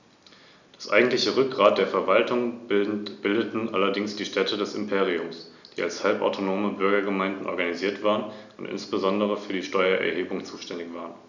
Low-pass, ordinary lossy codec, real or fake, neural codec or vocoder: 7.2 kHz; none; real; none